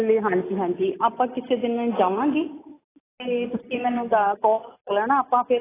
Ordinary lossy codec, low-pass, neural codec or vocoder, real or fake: AAC, 16 kbps; 3.6 kHz; none; real